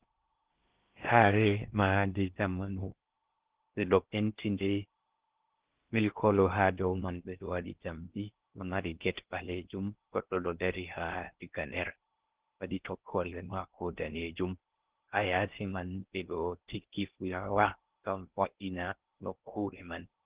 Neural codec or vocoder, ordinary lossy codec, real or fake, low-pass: codec, 16 kHz in and 24 kHz out, 0.6 kbps, FocalCodec, streaming, 2048 codes; Opus, 16 kbps; fake; 3.6 kHz